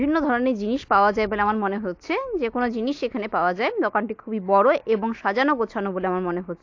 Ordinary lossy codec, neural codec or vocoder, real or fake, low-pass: AAC, 48 kbps; none; real; 7.2 kHz